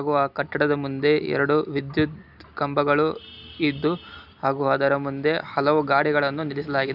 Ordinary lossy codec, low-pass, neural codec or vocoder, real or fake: none; 5.4 kHz; none; real